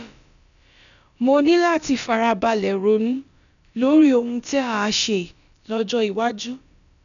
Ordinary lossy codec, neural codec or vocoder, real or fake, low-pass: none; codec, 16 kHz, about 1 kbps, DyCAST, with the encoder's durations; fake; 7.2 kHz